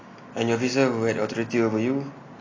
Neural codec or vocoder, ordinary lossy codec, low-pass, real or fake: none; AAC, 32 kbps; 7.2 kHz; real